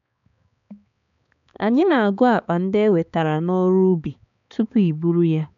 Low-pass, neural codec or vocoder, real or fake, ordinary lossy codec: 7.2 kHz; codec, 16 kHz, 4 kbps, X-Codec, HuBERT features, trained on balanced general audio; fake; none